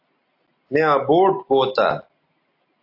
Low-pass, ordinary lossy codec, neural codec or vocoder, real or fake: 5.4 kHz; AAC, 32 kbps; none; real